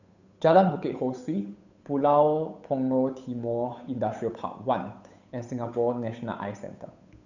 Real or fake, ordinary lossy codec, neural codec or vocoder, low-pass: fake; none; codec, 16 kHz, 8 kbps, FunCodec, trained on Chinese and English, 25 frames a second; 7.2 kHz